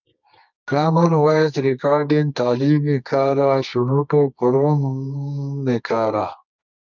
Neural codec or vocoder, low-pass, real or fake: codec, 24 kHz, 0.9 kbps, WavTokenizer, medium music audio release; 7.2 kHz; fake